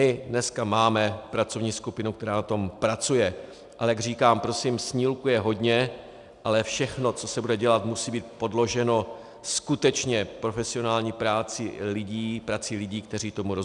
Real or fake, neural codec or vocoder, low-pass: real; none; 10.8 kHz